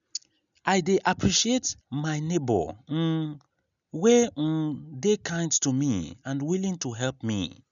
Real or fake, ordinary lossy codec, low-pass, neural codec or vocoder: real; none; 7.2 kHz; none